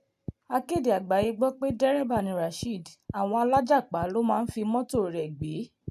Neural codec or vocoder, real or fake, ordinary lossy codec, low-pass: none; real; AAC, 96 kbps; 14.4 kHz